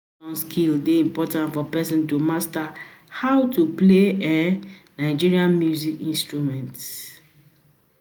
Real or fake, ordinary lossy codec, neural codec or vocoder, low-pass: real; none; none; none